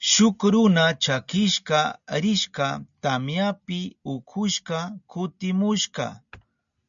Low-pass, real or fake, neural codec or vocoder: 7.2 kHz; real; none